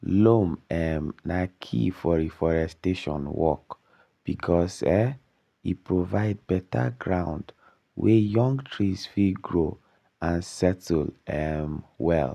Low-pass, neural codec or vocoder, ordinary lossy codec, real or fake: 14.4 kHz; none; none; real